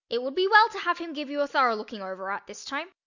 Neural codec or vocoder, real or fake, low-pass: none; real; 7.2 kHz